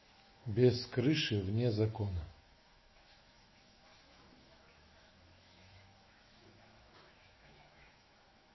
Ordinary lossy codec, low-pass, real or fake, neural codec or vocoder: MP3, 24 kbps; 7.2 kHz; fake; autoencoder, 48 kHz, 128 numbers a frame, DAC-VAE, trained on Japanese speech